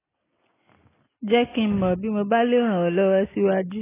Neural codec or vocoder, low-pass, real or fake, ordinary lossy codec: none; 3.6 kHz; real; AAC, 16 kbps